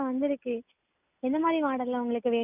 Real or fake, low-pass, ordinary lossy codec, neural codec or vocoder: real; 3.6 kHz; none; none